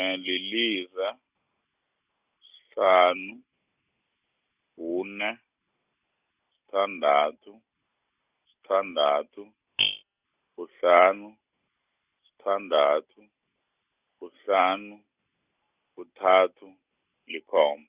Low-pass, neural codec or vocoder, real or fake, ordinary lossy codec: 3.6 kHz; none; real; Opus, 64 kbps